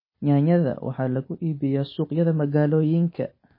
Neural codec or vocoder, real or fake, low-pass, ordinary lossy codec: none; real; 5.4 kHz; MP3, 24 kbps